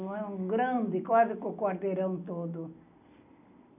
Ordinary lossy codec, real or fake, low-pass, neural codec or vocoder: none; real; 3.6 kHz; none